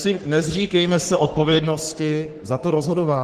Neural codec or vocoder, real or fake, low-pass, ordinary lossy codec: codec, 44.1 kHz, 3.4 kbps, Pupu-Codec; fake; 14.4 kHz; Opus, 16 kbps